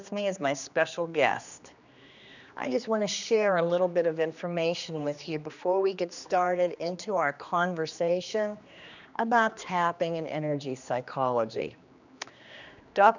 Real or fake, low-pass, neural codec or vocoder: fake; 7.2 kHz; codec, 16 kHz, 2 kbps, X-Codec, HuBERT features, trained on general audio